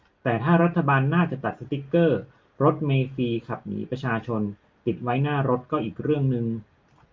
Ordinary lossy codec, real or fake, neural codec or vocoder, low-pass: Opus, 24 kbps; real; none; 7.2 kHz